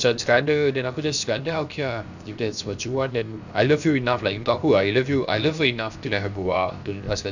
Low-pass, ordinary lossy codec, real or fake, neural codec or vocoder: 7.2 kHz; none; fake; codec, 16 kHz, 0.7 kbps, FocalCodec